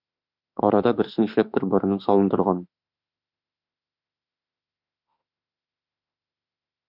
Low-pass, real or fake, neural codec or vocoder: 5.4 kHz; fake; autoencoder, 48 kHz, 32 numbers a frame, DAC-VAE, trained on Japanese speech